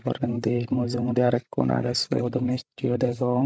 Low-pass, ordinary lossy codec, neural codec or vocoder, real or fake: none; none; codec, 16 kHz, 16 kbps, FreqCodec, larger model; fake